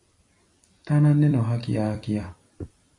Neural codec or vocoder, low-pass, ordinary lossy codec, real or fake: vocoder, 44.1 kHz, 128 mel bands every 256 samples, BigVGAN v2; 10.8 kHz; AAC, 48 kbps; fake